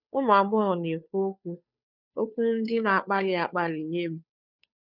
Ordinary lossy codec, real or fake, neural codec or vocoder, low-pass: none; fake; codec, 16 kHz, 2 kbps, FunCodec, trained on Chinese and English, 25 frames a second; 5.4 kHz